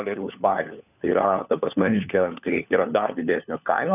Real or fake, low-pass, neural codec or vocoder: fake; 3.6 kHz; codec, 16 kHz, 4 kbps, FunCodec, trained on LibriTTS, 50 frames a second